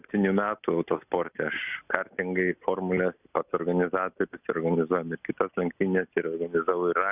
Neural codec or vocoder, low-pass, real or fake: none; 3.6 kHz; real